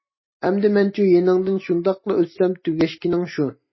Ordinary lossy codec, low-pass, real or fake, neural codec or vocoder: MP3, 24 kbps; 7.2 kHz; real; none